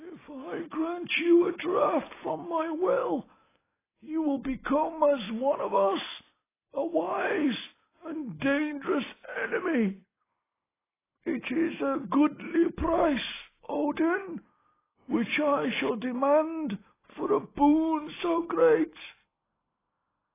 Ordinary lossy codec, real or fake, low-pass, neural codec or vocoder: AAC, 16 kbps; real; 3.6 kHz; none